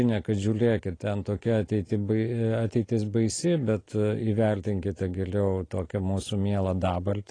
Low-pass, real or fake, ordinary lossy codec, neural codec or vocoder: 9.9 kHz; fake; AAC, 32 kbps; vocoder, 44.1 kHz, 128 mel bands every 256 samples, BigVGAN v2